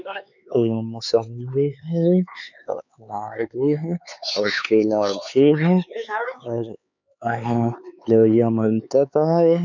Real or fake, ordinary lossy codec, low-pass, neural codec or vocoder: fake; none; 7.2 kHz; codec, 16 kHz, 4 kbps, X-Codec, HuBERT features, trained on LibriSpeech